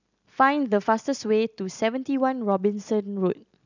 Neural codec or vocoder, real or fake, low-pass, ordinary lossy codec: none; real; 7.2 kHz; none